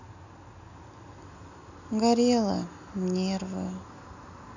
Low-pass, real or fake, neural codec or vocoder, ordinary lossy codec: 7.2 kHz; real; none; none